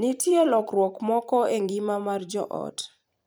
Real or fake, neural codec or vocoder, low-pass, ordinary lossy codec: fake; vocoder, 44.1 kHz, 128 mel bands every 256 samples, BigVGAN v2; none; none